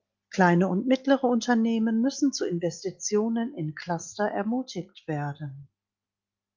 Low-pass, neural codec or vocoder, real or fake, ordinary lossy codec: 7.2 kHz; none; real; Opus, 32 kbps